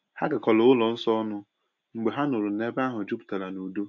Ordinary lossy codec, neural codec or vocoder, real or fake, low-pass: none; none; real; 7.2 kHz